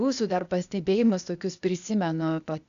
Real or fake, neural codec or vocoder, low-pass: fake; codec, 16 kHz, 0.8 kbps, ZipCodec; 7.2 kHz